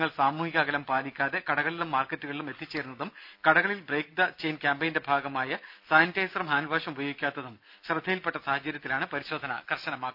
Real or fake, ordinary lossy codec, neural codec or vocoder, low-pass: real; none; none; 5.4 kHz